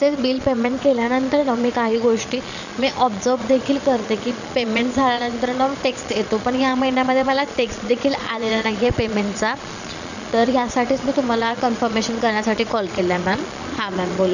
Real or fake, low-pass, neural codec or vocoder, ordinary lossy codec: fake; 7.2 kHz; vocoder, 22.05 kHz, 80 mel bands, Vocos; none